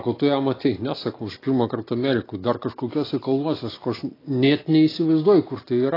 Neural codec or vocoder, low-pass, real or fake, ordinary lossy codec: none; 5.4 kHz; real; AAC, 24 kbps